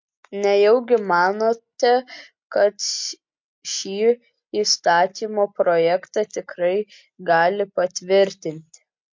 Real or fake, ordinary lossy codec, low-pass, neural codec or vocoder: real; MP3, 48 kbps; 7.2 kHz; none